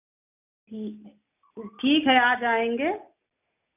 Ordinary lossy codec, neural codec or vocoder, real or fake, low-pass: none; none; real; 3.6 kHz